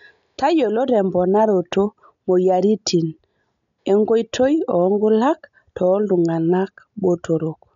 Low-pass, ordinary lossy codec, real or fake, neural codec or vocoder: 7.2 kHz; MP3, 96 kbps; real; none